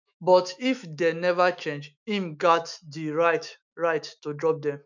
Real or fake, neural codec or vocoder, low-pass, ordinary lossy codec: fake; autoencoder, 48 kHz, 128 numbers a frame, DAC-VAE, trained on Japanese speech; 7.2 kHz; none